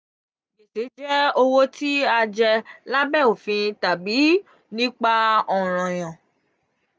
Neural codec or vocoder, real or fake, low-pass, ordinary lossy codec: none; real; none; none